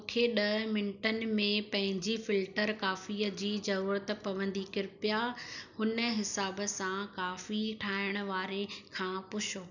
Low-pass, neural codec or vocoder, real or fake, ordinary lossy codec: 7.2 kHz; none; real; none